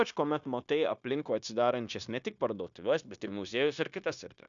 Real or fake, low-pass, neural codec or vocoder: fake; 7.2 kHz; codec, 16 kHz, 0.9 kbps, LongCat-Audio-Codec